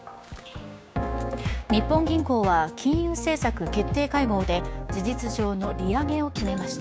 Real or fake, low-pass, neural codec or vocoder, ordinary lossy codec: fake; none; codec, 16 kHz, 6 kbps, DAC; none